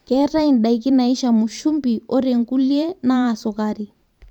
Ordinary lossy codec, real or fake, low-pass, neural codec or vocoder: none; fake; 19.8 kHz; vocoder, 44.1 kHz, 128 mel bands every 512 samples, BigVGAN v2